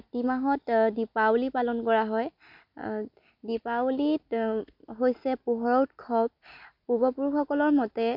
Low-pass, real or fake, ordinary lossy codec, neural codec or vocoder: 5.4 kHz; real; MP3, 48 kbps; none